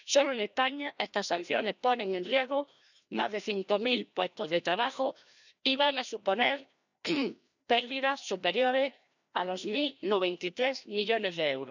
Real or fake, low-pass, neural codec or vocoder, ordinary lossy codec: fake; 7.2 kHz; codec, 16 kHz, 1 kbps, FreqCodec, larger model; none